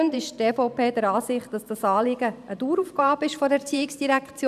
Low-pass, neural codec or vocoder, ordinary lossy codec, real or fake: 14.4 kHz; vocoder, 44.1 kHz, 128 mel bands every 512 samples, BigVGAN v2; none; fake